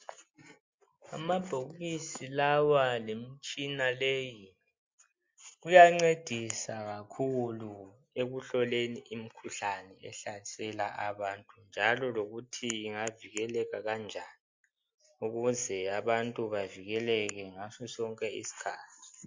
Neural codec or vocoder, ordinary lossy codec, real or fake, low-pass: none; MP3, 48 kbps; real; 7.2 kHz